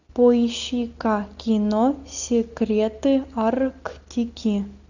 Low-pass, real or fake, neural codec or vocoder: 7.2 kHz; real; none